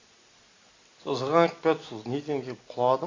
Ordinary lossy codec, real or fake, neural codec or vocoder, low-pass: AAC, 32 kbps; real; none; 7.2 kHz